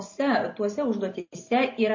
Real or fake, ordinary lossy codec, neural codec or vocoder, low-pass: real; MP3, 32 kbps; none; 7.2 kHz